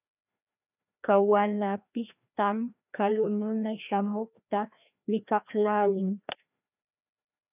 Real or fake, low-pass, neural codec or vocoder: fake; 3.6 kHz; codec, 16 kHz, 1 kbps, FreqCodec, larger model